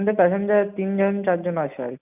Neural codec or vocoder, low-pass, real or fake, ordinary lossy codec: none; 3.6 kHz; real; none